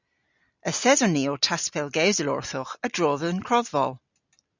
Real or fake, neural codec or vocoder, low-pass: real; none; 7.2 kHz